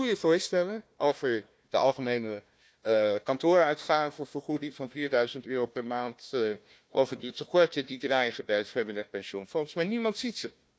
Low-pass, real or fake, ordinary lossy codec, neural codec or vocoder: none; fake; none; codec, 16 kHz, 1 kbps, FunCodec, trained on Chinese and English, 50 frames a second